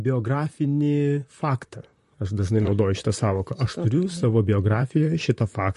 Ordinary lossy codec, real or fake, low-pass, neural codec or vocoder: MP3, 48 kbps; fake; 14.4 kHz; vocoder, 44.1 kHz, 128 mel bands, Pupu-Vocoder